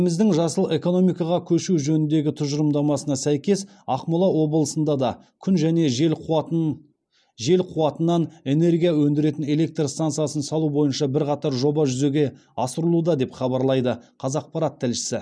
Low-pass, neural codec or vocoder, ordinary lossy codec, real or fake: none; none; none; real